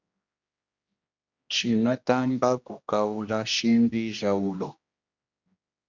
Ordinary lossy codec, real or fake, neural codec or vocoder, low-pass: Opus, 64 kbps; fake; codec, 16 kHz, 1 kbps, X-Codec, HuBERT features, trained on general audio; 7.2 kHz